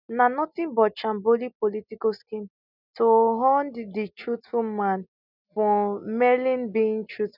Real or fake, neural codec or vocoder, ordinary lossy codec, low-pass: real; none; none; 5.4 kHz